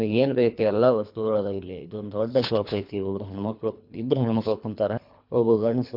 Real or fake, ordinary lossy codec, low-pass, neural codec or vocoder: fake; MP3, 48 kbps; 5.4 kHz; codec, 24 kHz, 3 kbps, HILCodec